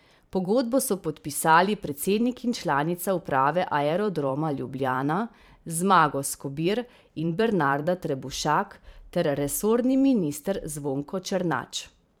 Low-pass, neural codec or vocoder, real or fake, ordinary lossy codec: none; vocoder, 44.1 kHz, 128 mel bands every 512 samples, BigVGAN v2; fake; none